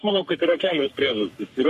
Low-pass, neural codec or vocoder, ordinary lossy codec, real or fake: 14.4 kHz; codec, 44.1 kHz, 3.4 kbps, Pupu-Codec; MP3, 64 kbps; fake